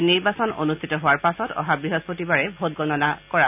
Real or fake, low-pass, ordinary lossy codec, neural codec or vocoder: real; 3.6 kHz; none; none